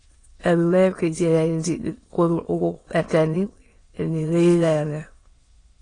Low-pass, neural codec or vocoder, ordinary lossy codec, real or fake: 9.9 kHz; autoencoder, 22.05 kHz, a latent of 192 numbers a frame, VITS, trained on many speakers; AAC, 32 kbps; fake